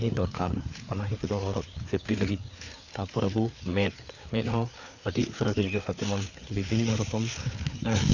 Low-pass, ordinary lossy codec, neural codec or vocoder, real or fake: 7.2 kHz; none; codec, 16 kHz, 4 kbps, FreqCodec, larger model; fake